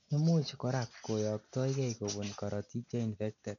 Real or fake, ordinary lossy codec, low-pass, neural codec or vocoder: real; none; 7.2 kHz; none